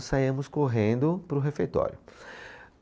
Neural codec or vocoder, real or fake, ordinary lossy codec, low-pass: none; real; none; none